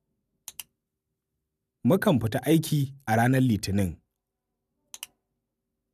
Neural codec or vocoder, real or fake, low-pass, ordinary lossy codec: none; real; 14.4 kHz; none